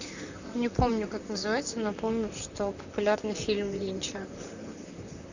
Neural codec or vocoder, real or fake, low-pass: vocoder, 44.1 kHz, 128 mel bands, Pupu-Vocoder; fake; 7.2 kHz